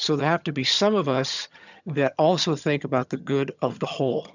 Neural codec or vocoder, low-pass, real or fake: vocoder, 22.05 kHz, 80 mel bands, HiFi-GAN; 7.2 kHz; fake